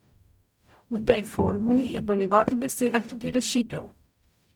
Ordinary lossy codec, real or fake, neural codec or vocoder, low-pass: none; fake; codec, 44.1 kHz, 0.9 kbps, DAC; 19.8 kHz